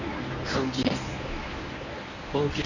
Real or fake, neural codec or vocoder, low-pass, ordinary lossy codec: fake; codec, 24 kHz, 0.9 kbps, WavTokenizer, medium speech release version 1; 7.2 kHz; none